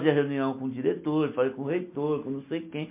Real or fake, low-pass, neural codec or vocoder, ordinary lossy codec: real; 3.6 kHz; none; MP3, 24 kbps